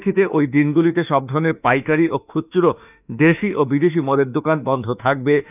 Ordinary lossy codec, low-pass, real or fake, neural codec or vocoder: none; 3.6 kHz; fake; autoencoder, 48 kHz, 32 numbers a frame, DAC-VAE, trained on Japanese speech